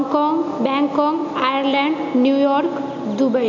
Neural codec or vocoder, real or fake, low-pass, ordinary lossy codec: none; real; 7.2 kHz; none